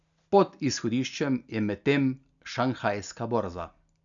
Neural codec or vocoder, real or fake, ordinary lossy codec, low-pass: none; real; none; 7.2 kHz